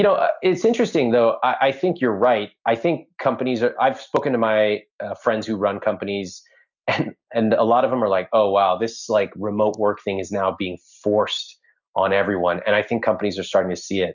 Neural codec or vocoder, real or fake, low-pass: none; real; 7.2 kHz